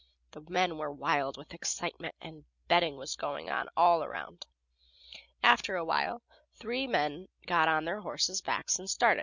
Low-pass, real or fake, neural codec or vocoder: 7.2 kHz; real; none